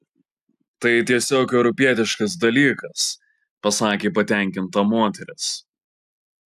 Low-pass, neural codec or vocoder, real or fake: 14.4 kHz; none; real